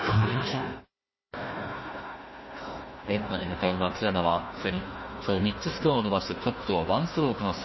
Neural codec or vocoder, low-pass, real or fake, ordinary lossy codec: codec, 16 kHz, 1 kbps, FunCodec, trained on Chinese and English, 50 frames a second; 7.2 kHz; fake; MP3, 24 kbps